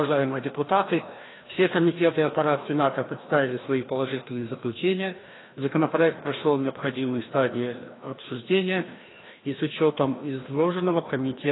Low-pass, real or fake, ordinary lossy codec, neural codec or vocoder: 7.2 kHz; fake; AAC, 16 kbps; codec, 16 kHz, 1 kbps, FreqCodec, larger model